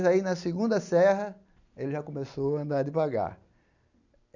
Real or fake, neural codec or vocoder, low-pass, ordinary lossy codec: real; none; 7.2 kHz; none